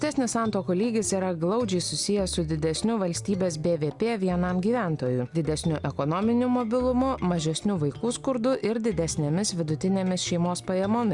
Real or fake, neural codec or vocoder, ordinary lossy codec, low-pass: real; none; Opus, 64 kbps; 10.8 kHz